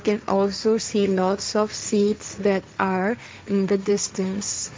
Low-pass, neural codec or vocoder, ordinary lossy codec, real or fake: none; codec, 16 kHz, 1.1 kbps, Voila-Tokenizer; none; fake